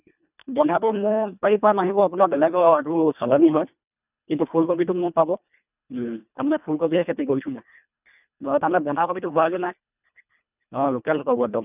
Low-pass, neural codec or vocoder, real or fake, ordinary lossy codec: 3.6 kHz; codec, 24 kHz, 1.5 kbps, HILCodec; fake; none